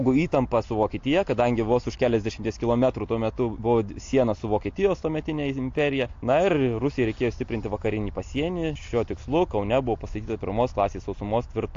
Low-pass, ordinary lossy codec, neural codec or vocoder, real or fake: 7.2 kHz; AAC, 48 kbps; none; real